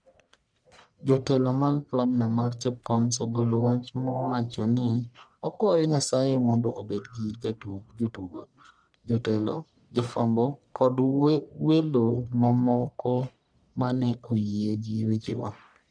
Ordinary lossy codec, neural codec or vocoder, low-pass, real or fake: none; codec, 44.1 kHz, 1.7 kbps, Pupu-Codec; 9.9 kHz; fake